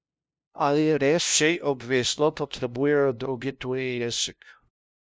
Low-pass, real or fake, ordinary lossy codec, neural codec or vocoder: none; fake; none; codec, 16 kHz, 0.5 kbps, FunCodec, trained on LibriTTS, 25 frames a second